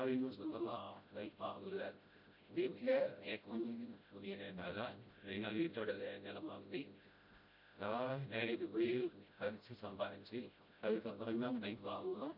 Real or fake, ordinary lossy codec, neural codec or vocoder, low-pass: fake; MP3, 48 kbps; codec, 16 kHz, 0.5 kbps, FreqCodec, smaller model; 5.4 kHz